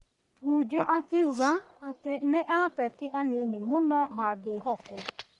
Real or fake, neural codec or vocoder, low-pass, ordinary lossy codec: fake; codec, 44.1 kHz, 1.7 kbps, Pupu-Codec; 10.8 kHz; none